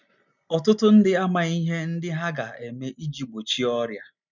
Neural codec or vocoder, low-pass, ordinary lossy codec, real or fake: none; 7.2 kHz; none; real